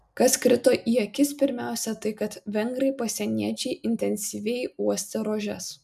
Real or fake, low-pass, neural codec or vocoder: real; 14.4 kHz; none